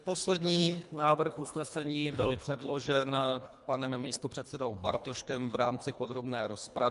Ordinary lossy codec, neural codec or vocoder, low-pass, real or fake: MP3, 96 kbps; codec, 24 kHz, 1.5 kbps, HILCodec; 10.8 kHz; fake